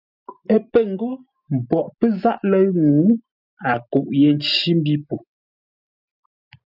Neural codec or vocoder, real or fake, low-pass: none; real; 5.4 kHz